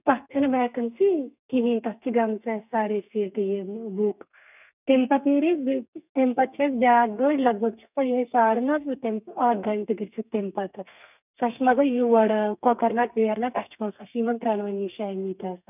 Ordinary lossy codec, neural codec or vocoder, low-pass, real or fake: none; codec, 32 kHz, 1.9 kbps, SNAC; 3.6 kHz; fake